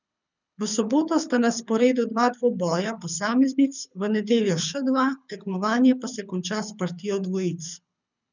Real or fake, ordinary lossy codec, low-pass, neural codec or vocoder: fake; none; 7.2 kHz; codec, 24 kHz, 6 kbps, HILCodec